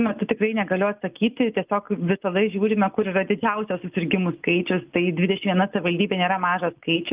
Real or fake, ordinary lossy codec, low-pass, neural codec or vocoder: real; Opus, 24 kbps; 3.6 kHz; none